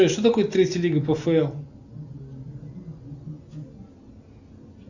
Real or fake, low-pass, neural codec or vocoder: real; 7.2 kHz; none